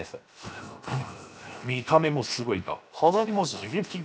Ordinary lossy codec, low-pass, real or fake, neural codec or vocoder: none; none; fake; codec, 16 kHz, 0.7 kbps, FocalCodec